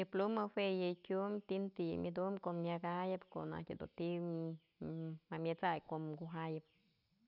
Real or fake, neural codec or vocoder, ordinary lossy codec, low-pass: real; none; none; 5.4 kHz